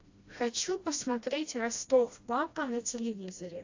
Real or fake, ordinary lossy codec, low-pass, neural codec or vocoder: fake; AAC, 48 kbps; 7.2 kHz; codec, 16 kHz, 1 kbps, FreqCodec, smaller model